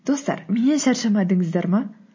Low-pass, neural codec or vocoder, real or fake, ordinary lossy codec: 7.2 kHz; none; real; MP3, 32 kbps